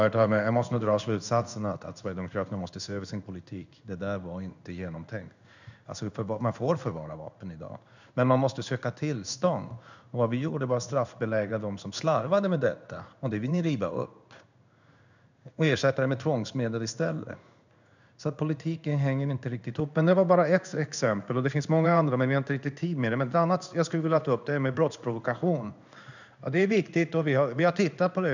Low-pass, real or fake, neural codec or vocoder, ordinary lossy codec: 7.2 kHz; fake; codec, 16 kHz in and 24 kHz out, 1 kbps, XY-Tokenizer; none